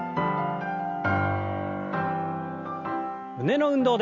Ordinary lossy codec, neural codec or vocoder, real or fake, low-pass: Opus, 64 kbps; none; real; 7.2 kHz